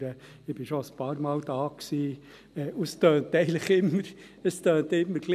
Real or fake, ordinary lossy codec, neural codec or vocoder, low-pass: real; none; none; 14.4 kHz